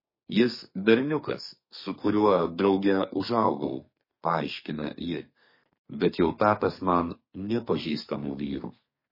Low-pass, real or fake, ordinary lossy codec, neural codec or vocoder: 5.4 kHz; fake; MP3, 24 kbps; codec, 32 kHz, 1.9 kbps, SNAC